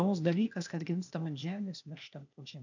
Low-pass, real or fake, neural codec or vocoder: 7.2 kHz; fake; codec, 16 kHz, 0.8 kbps, ZipCodec